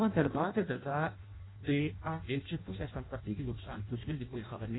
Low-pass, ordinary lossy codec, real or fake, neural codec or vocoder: 7.2 kHz; AAC, 16 kbps; fake; codec, 16 kHz in and 24 kHz out, 0.6 kbps, FireRedTTS-2 codec